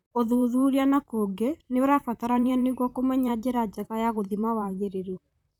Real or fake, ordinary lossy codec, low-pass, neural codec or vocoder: fake; none; 19.8 kHz; vocoder, 44.1 kHz, 128 mel bands, Pupu-Vocoder